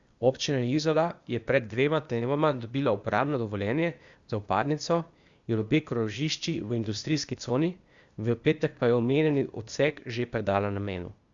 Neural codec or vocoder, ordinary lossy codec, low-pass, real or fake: codec, 16 kHz, 0.8 kbps, ZipCodec; Opus, 64 kbps; 7.2 kHz; fake